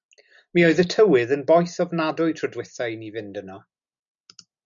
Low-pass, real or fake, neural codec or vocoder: 7.2 kHz; real; none